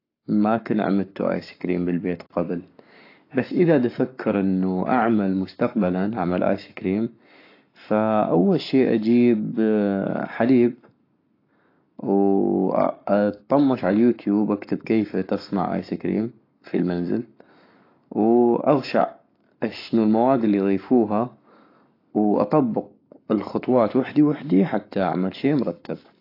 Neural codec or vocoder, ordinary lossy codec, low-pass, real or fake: codec, 44.1 kHz, 7.8 kbps, Pupu-Codec; AAC, 32 kbps; 5.4 kHz; fake